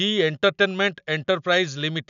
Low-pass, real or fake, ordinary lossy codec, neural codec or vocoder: 7.2 kHz; real; none; none